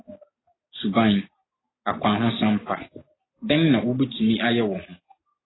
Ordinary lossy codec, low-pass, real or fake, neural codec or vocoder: AAC, 16 kbps; 7.2 kHz; real; none